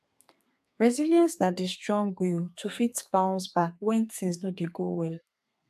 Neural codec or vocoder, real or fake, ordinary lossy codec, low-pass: codec, 32 kHz, 1.9 kbps, SNAC; fake; AAC, 96 kbps; 14.4 kHz